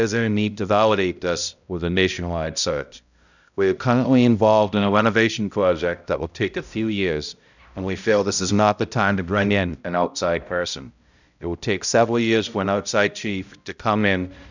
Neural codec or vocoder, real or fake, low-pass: codec, 16 kHz, 0.5 kbps, X-Codec, HuBERT features, trained on balanced general audio; fake; 7.2 kHz